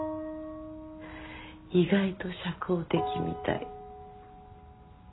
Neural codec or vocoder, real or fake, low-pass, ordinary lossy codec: none; real; 7.2 kHz; AAC, 16 kbps